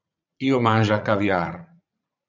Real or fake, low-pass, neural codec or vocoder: fake; 7.2 kHz; vocoder, 44.1 kHz, 80 mel bands, Vocos